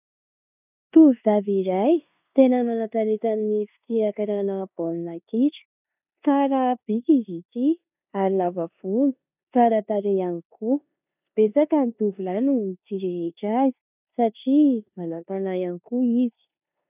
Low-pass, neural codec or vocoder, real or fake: 3.6 kHz; codec, 16 kHz in and 24 kHz out, 0.9 kbps, LongCat-Audio-Codec, four codebook decoder; fake